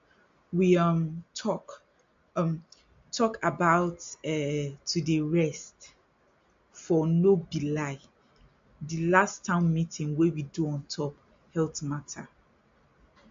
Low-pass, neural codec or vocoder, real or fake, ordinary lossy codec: 7.2 kHz; none; real; MP3, 48 kbps